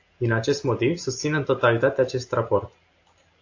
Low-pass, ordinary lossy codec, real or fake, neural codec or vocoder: 7.2 kHz; AAC, 48 kbps; real; none